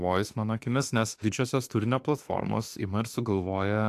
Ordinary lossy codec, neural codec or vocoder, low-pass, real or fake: AAC, 64 kbps; autoencoder, 48 kHz, 32 numbers a frame, DAC-VAE, trained on Japanese speech; 14.4 kHz; fake